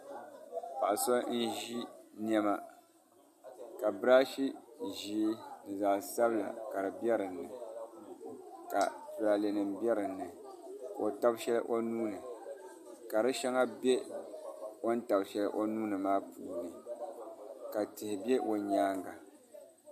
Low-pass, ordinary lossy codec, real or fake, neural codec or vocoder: 14.4 kHz; MP3, 64 kbps; real; none